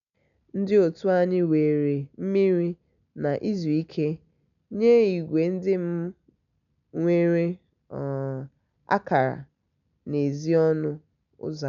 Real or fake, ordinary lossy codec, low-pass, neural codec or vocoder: real; none; 7.2 kHz; none